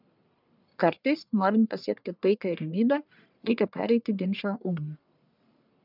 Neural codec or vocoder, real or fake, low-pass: codec, 44.1 kHz, 1.7 kbps, Pupu-Codec; fake; 5.4 kHz